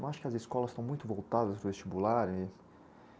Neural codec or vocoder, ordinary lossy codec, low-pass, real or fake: none; none; none; real